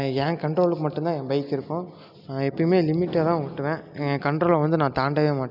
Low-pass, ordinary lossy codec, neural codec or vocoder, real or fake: 5.4 kHz; none; none; real